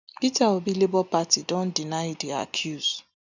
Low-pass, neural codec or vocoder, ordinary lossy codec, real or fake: 7.2 kHz; none; none; real